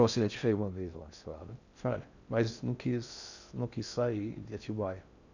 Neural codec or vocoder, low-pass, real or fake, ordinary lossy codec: codec, 16 kHz in and 24 kHz out, 0.6 kbps, FocalCodec, streaming, 4096 codes; 7.2 kHz; fake; none